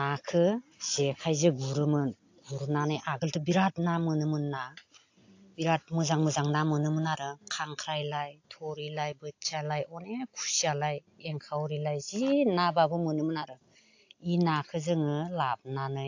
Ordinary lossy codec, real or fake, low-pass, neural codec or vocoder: AAC, 48 kbps; real; 7.2 kHz; none